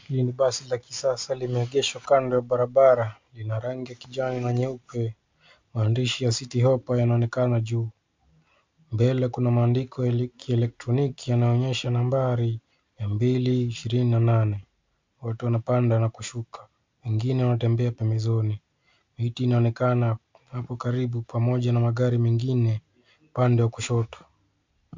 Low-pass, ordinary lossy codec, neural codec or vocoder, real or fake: 7.2 kHz; MP3, 64 kbps; none; real